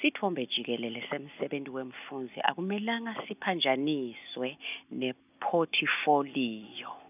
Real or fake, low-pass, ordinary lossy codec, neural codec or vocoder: real; 3.6 kHz; none; none